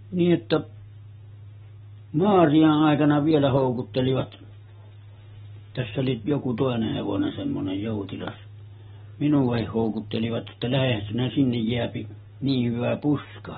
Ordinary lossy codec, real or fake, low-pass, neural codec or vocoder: AAC, 16 kbps; real; 7.2 kHz; none